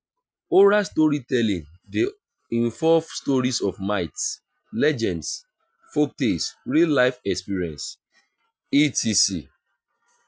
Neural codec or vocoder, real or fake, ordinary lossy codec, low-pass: none; real; none; none